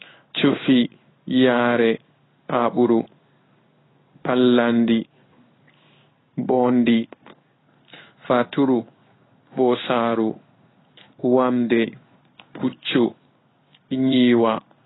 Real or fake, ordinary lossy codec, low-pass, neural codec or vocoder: fake; AAC, 16 kbps; 7.2 kHz; codec, 16 kHz in and 24 kHz out, 1 kbps, XY-Tokenizer